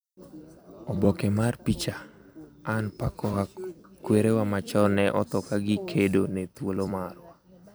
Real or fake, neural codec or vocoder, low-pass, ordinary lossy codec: fake; vocoder, 44.1 kHz, 128 mel bands every 256 samples, BigVGAN v2; none; none